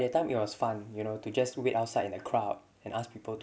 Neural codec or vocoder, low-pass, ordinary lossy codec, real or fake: none; none; none; real